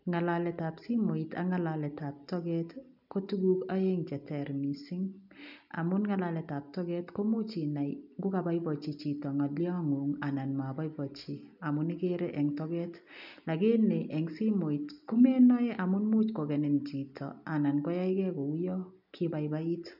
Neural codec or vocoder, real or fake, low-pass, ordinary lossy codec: none; real; 5.4 kHz; none